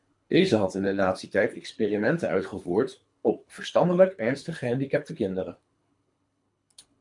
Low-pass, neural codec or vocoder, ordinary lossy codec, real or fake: 10.8 kHz; codec, 24 kHz, 3 kbps, HILCodec; MP3, 64 kbps; fake